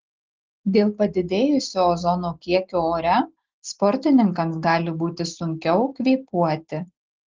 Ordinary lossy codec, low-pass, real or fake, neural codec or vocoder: Opus, 16 kbps; 7.2 kHz; real; none